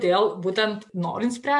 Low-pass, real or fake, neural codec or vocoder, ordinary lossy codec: 10.8 kHz; real; none; MP3, 64 kbps